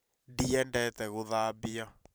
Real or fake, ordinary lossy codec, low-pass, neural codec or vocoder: fake; none; none; vocoder, 44.1 kHz, 128 mel bands every 512 samples, BigVGAN v2